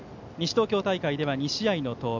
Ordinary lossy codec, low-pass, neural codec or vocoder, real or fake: none; 7.2 kHz; none; real